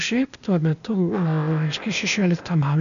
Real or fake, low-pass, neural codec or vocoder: fake; 7.2 kHz; codec, 16 kHz, 0.8 kbps, ZipCodec